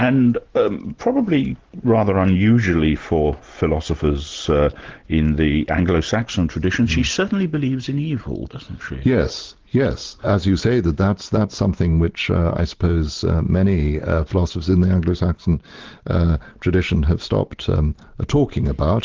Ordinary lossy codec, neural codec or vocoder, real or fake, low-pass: Opus, 16 kbps; none; real; 7.2 kHz